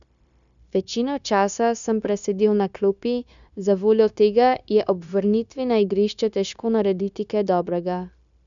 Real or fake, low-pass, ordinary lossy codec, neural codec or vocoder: fake; 7.2 kHz; none; codec, 16 kHz, 0.9 kbps, LongCat-Audio-Codec